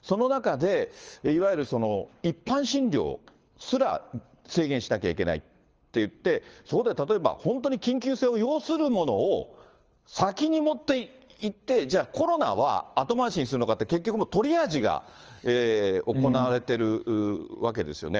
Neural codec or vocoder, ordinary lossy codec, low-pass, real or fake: codec, 24 kHz, 6 kbps, HILCodec; Opus, 24 kbps; 7.2 kHz; fake